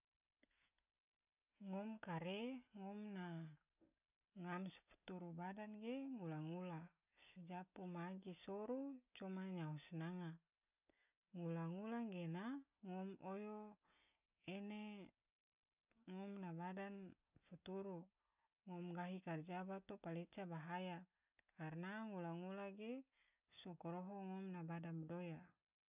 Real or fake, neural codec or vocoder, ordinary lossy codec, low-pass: real; none; none; 3.6 kHz